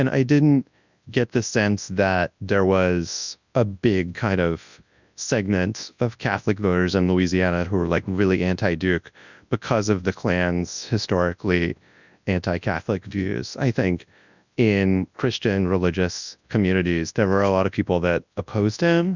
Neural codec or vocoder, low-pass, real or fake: codec, 24 kHz, 0.9 kbps, WavTokenizer, large speech release; 7.2 kHz; fake